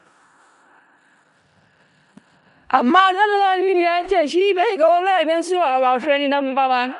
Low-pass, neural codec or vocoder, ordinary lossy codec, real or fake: 10.8 kHz; codec, 16 kHz in and 24 kHz out, 0.4 kbps, LongCat-Audio-Codec, four codebook decoder; none; fake